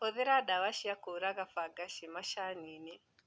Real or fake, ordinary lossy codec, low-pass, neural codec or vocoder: real; none; none; none